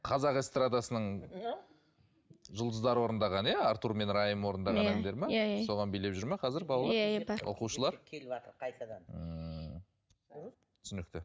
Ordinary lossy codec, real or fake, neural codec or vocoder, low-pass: none; real; none; none